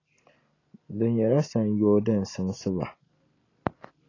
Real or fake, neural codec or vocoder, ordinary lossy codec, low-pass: real; none; AAC, 32 kbps; 7.2 kHz